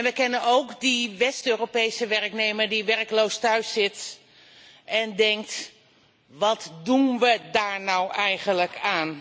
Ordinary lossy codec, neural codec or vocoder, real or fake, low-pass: none; none; real; none